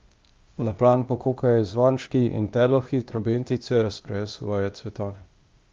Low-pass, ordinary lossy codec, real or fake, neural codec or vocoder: 7.2 kHz; Opus, 32 kbps; fake; codec, 16 kHz, 0.8 kbps, ZipCodec